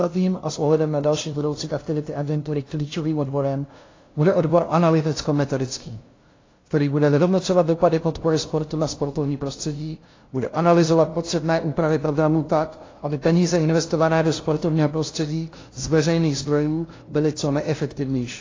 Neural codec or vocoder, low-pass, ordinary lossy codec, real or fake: codec, 16 kHz, 0.5 kbps, FunCodec, trained on LibriTTS, 25 frames a second; 7.2 kHz; AAC, 32 kbps; fake